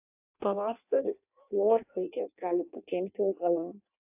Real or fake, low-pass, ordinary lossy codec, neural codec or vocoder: fake; 3.6 kHz; AAC, 32 kbps; codec, 16 kHz in and 24 kHz out, 0.6 kbps, FireRedTTS-2 codec